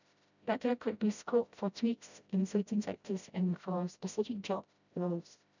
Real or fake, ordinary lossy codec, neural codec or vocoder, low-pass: fake; none; codec, 16 kHz, 0.5 kbps, FreqCodec, smaller model; 7.2 kHz